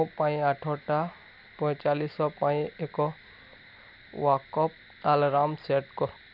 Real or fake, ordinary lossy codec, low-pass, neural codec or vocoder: real; none; 5.4 kHz; none